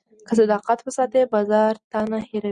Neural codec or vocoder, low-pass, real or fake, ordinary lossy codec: none; 9.9 kHz; real; Opus, 64 kbps